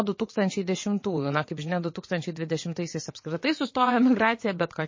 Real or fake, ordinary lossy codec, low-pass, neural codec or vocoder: fake; MP3, 32 kbps; 7.2 kHz; vocoder, 22.05 kHz, 80 mel bands, WaveNeXt